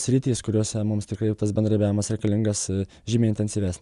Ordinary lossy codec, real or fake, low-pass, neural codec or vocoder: Opus, 64 kbps; real; 10.8 kHz; none